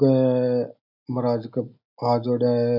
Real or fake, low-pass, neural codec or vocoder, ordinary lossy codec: real; 5.4 kHz; none; none